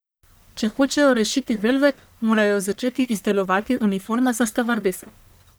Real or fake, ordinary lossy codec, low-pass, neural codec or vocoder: fake; none; none; codec, 44.1 kHz, 1.7 kbps, Pupu-Codec